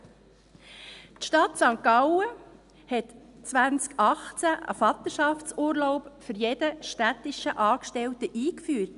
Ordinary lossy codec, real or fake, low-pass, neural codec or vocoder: none; real; 10.8 kHz; none